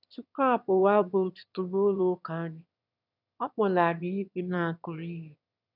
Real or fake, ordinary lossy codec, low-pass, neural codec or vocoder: fake; none; 5.4 kHz; autoencoder, 22.05 kHz, a latent of 192 numbers a frame, VITS, trained on one speaker